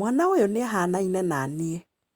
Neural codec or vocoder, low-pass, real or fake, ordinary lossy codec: none; 19.8 kHz; real; Opus, 64 kbps